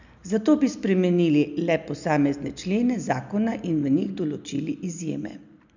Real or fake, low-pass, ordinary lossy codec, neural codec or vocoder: real; 7.2 kHz; none; none